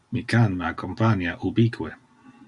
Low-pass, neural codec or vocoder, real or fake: 10.8 kHz; none; real